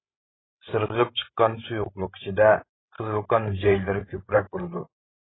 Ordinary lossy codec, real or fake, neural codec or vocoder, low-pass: AAC, 16 kbps; fake; codec, 16 kHz, 16 kbps, FreqCodec, larger model; 7.2 kHz